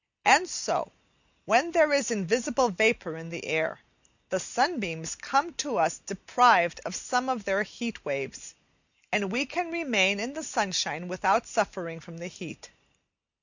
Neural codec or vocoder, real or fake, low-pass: none; real; 7.2 kHz